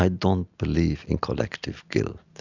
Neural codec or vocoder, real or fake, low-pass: none; real; 7.2 kHz